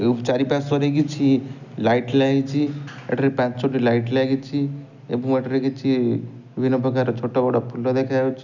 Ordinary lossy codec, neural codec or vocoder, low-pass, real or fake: none; none; 7.2 kHz; real